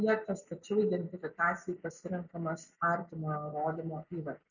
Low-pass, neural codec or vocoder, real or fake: 7.2 kHz; none; real